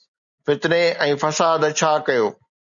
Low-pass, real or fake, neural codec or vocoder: 7.2 kHz; real; none